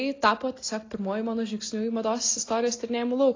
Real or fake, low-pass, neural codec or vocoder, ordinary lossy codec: real; 7.2 kHz; none; AAC, 32 kbps